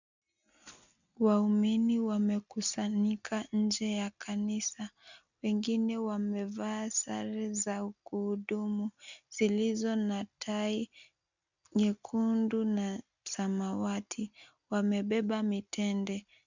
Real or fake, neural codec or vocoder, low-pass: real; none; 7.2 kHz